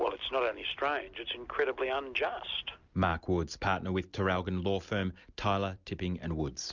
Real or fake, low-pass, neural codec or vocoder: real; 7.2 kHz; none